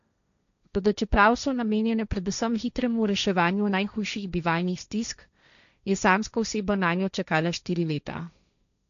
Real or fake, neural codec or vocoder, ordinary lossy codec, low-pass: fake; codec, 16 kHz, 1.1 kbps, Voila-Tokenizer; none; 7.2 kHz